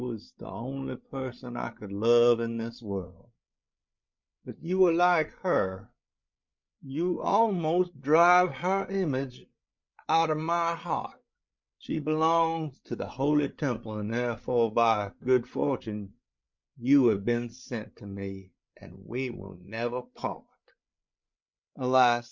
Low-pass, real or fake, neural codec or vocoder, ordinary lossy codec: 7.2 kHz; fake; codec, 16 kHz, 8 kbps, FreqCodec, larger model; MP3, 64 kbps